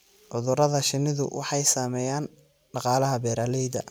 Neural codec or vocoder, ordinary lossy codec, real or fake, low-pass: vocoder, 44.1 kHz, 128 mel bands every 256 samples, BigVGAN v2; none; fake; none